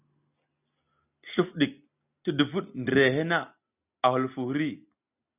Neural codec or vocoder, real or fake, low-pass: none; real; 3.6 kHz